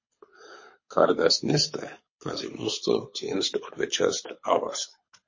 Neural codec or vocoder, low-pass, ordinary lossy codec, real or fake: codec, 24 kHz, 6 kbps, HILCodec; 7.2 kHz; MP3, 32 kbps; fake